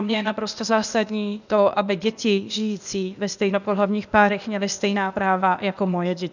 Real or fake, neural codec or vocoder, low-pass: fake; codec, 16 kHz, 0.8 kbps, ZipCodec; 7.2 kHz